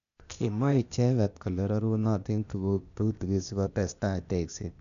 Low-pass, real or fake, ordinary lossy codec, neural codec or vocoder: 7.2 kHz; fake; none; codec, 16 kHz, 0.8 kbps, ZipCodec